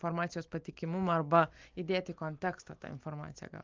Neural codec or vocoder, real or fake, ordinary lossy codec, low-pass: none; real; Opus, 24 kbps; 7.2 kHz